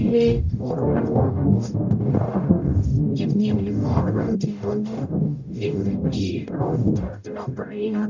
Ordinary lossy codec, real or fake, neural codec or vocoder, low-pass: none; fake; codec, 44.1 kHz, 0.9 kbps, DAC; 7.2 kHz